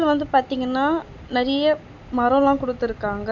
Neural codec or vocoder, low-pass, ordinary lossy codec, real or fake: none; 7.2 kHz; none; real